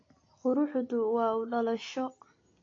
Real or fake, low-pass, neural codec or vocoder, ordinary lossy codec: real; 7.2 kHz; none; AAC, 32 kbps